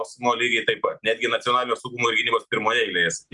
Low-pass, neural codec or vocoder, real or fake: 10.8 kHz; none; real